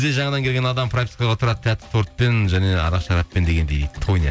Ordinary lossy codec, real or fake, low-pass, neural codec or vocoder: none; real; none; none